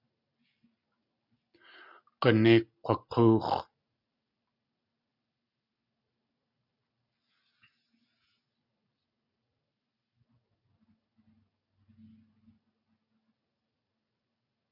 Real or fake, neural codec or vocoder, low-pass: real; none; 5.4 kHz